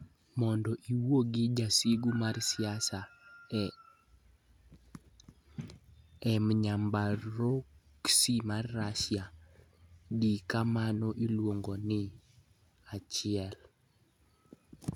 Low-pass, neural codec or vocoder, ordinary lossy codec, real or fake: 19.8 kHz; none; none; real